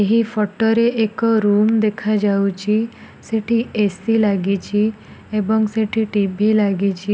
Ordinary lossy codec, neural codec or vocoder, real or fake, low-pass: none; none; real; none